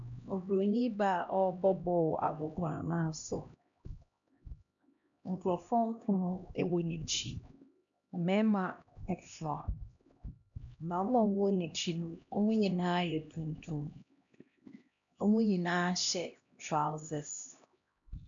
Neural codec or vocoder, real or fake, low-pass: codec, 16 kHz, 1 kbps, X-Codec, HuBERT features, trained on LibriSpeech; fake; 7.2 kHz